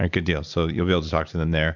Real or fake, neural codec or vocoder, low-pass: real; none; 7.2 kHz